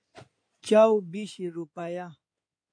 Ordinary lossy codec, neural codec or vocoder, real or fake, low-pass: MP3, 48 kbps; codec, 16 kHz in and 24 kHz out, 2.2 kbps, FireRedTTS-2 codec; fake; 9.9 kHz